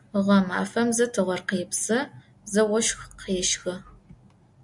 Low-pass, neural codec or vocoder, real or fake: 10.8 kHz; none; real